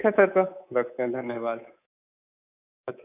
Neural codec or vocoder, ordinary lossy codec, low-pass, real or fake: codec, 24 kHz, 3.1 kbps, DualCodec; none; 3.6 kHz; fake